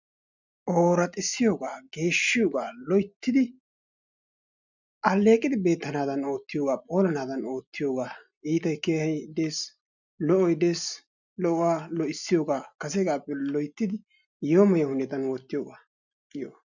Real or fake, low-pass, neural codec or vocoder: real; 7.2 kHz; none